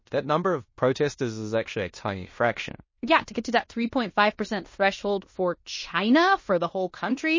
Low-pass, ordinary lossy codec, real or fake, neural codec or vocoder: 7.2 kHz; MP3, 32 kbps; fake; codec, 16 kHz in and 24 kHz out, 0.9 kbps, LongCat-Audio-Codec, fine tuned four codebook decoder